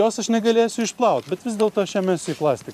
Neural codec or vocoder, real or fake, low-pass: vocoder, 44.1 kHz, 128 mel bands every 512 samples, BigVGAN v2; fake; 14.4 kHz